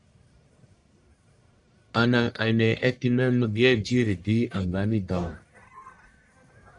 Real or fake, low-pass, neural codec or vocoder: fake; 10.8 kHz; codec, 44.1 kHz, 1.7 kbps, Pupu-Codec